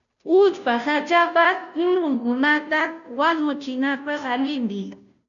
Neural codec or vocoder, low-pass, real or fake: codec, 16 kHz, 0.5 kbps, FunCodec, trained on Chinese and English, 25 frames a second; 7.2 kHz; fake